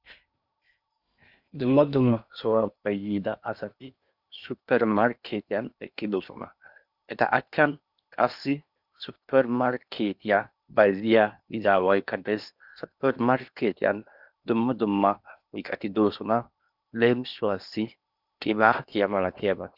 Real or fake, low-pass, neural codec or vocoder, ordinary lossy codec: fake; 5.4 kHz; codec, 16 kHz in and 24 kHz out, 0.8 kbps, FocalCodec, streaming, 65536 codes; Opus, 64 kbps